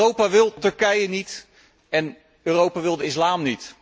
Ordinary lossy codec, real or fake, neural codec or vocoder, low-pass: none; real; none; none